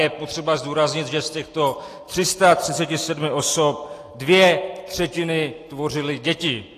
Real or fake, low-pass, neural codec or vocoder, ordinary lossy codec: real; 14.4 kHz; none; AAC, 48 kbps